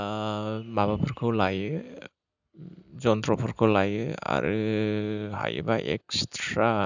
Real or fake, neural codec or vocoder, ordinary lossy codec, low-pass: real; none; none; 7.2 kHz